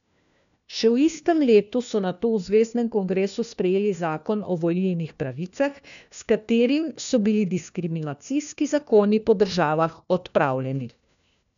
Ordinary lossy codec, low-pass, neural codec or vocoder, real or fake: none; 7.2 kHz; codec, 16 kHz, 1 kbps, FunCodec, trained on LibriTTS, 50 frames a second; fake